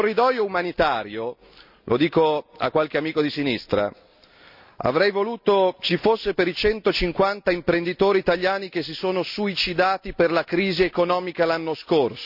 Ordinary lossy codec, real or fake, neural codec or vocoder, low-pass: none; real; none; 5.4 kHz